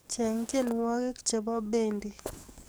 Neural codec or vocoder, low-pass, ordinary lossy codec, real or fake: codec, 44.1 kHz, 7.8 kbps, DAC; none; none; fake